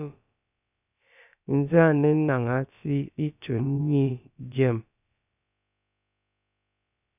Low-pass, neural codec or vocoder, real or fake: 3.6 kHz; codec, 16 kHz, about 1 kbps, DyCAST, with the encoder's durations; fake